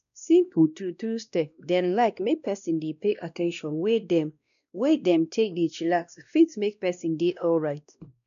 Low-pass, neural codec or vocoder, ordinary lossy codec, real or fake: 7.2 kHz; codec, 16 kHz, 1 kbps, X-Codec, WavLM features, trained on Multilingual LibriSpeech; none; fake